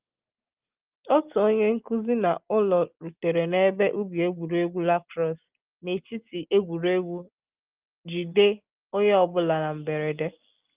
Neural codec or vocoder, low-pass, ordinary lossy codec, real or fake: none; 3.6 kHz; Opus, 32 kbps; real